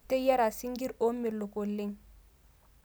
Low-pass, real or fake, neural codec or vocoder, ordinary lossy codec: none; real; none; none